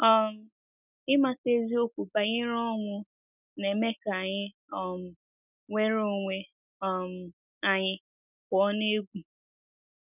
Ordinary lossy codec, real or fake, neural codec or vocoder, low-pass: none; real; none; 3.6 kHz